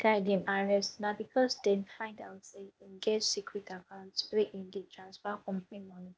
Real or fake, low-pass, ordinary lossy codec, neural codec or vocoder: fake; none; none; codec, 16 kHz, 0.8 kbps, ZipCodec